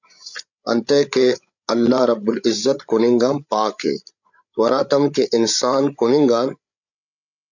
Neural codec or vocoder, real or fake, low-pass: codec, 16 kHz, 8 kbps, FreqCodec, larger model; fake; 7.2 kHz